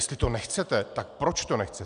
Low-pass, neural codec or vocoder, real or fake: 9.9 kHz; none; real